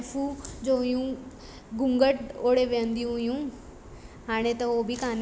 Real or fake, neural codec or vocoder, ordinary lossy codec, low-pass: real; none; none; none